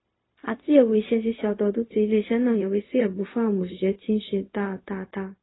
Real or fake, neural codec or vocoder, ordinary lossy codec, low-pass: fake; codec, 16 kHz, 0.4 kbps, LongCat-Audio-Codec; AAC, 16 kbps; 7.2 kHz